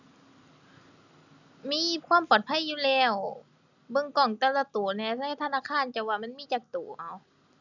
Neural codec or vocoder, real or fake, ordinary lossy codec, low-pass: none; real; none; 7.2 kHz